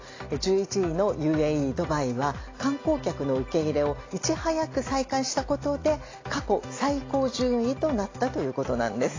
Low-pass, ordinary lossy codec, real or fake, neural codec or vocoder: 7.2 kHz; AAC, 32 kbps; real; none